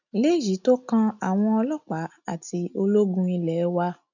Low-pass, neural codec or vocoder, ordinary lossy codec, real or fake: 7.2 kHz; none; none; real